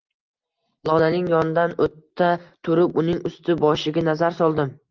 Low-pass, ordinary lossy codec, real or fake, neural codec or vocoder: 7.2 kHz; Opus, 32 kbps; real; none